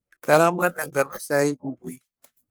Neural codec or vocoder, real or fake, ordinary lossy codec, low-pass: codec, 44.1 kHz, 1.7 kbps, Pupu-Codec; fake; none; none